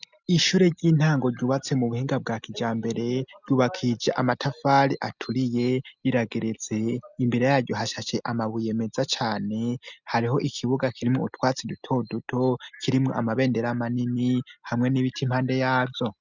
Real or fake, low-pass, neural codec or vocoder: real; 7.2 kHz; none